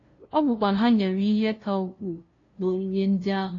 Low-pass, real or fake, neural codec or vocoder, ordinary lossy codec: 7.2 kHz; fake; codec, 16 kHz, 0.5 kbps, FunCodec, trained on LibriTTS, 25 frames a second; AAC, 32 kbps